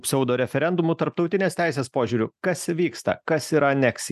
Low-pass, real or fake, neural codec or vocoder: 14.4 kHz; real; none